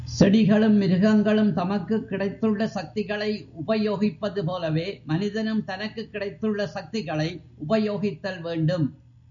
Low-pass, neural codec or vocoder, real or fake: 7.2 kHz; none; real